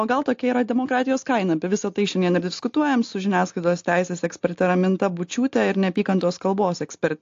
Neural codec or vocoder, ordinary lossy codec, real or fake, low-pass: none; AAC, 48 kbps; real; 7.2 kHz